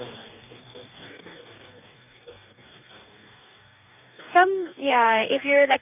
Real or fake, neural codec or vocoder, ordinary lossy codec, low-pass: fake; codec, 44.1 kHz, 2.6 kbps, DAC; none; 3.6 kHz